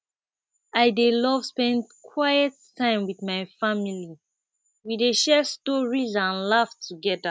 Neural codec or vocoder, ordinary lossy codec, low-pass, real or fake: none; none; none; real